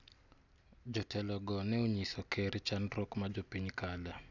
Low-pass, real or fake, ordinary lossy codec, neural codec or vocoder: 7.2 kHz; real; none; none